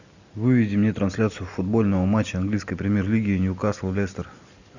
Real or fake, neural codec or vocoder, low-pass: real; none; 7.2 kHz